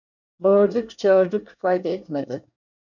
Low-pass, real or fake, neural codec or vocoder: 7.2 kHz; fake; codec, 24 kHz, 1 kbps, SNAC